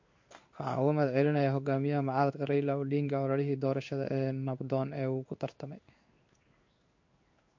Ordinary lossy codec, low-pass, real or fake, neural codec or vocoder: MP3, 48 kbps; 7.2 kHz; fake; codec, 16 kHz in and 24 kHz out, 1 kbps, XY-Tokenizer